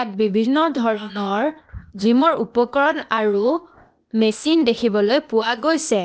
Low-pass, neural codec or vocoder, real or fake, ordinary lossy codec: none; codec, 16 kHz, 0.8 kbps, ZipCodec; fake; none